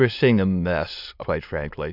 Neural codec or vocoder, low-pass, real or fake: autoencoder, 22.05 kHz, a latent of 192 numbers a frame, VITS, trained on many speakers; 5.4 kHz; fake